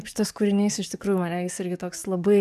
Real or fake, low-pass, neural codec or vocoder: fake; 14.4 kHz; codec, 44.1 kHz, 7.8 kbps, DAC